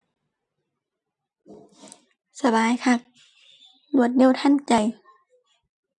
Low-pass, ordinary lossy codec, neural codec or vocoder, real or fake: 10.8 kHz; none; none; real